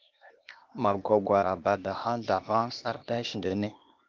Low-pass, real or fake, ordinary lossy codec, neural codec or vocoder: 7.2 kHz; fake; Opus, 24 kbps; codec, 16 kHz, 0.8 kbps, ZipCodec